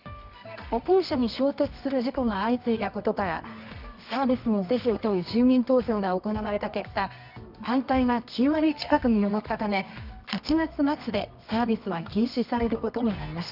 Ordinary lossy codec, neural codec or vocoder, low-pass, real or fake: none; codec, 24 kHz, 0.9 kbps, WavTokenizer, medium music audio release; 5.4 kHz; fake